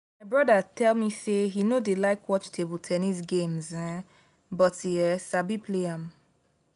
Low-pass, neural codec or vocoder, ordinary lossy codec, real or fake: 10.8 kHz; none; none; real